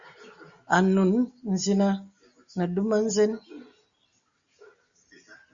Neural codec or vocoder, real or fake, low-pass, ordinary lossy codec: none; real; 7.2 kHz; Opus, 64 kbps